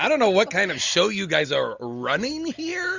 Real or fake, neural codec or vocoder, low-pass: real; none; 7.2 kHz